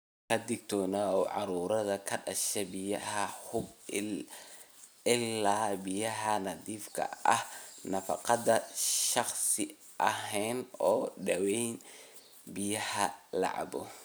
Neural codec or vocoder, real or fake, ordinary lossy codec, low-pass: none; real; none; none